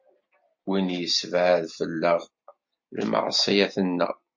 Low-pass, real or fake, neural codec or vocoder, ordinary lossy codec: 7.2 kHz; real; none; MP3, 48 kbps